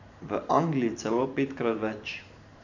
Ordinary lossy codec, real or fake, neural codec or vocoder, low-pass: none; fake; vocoder, 44.1 kHz, 128 mel bands every 512 samples, BigVGAN v2; 7.2 kHz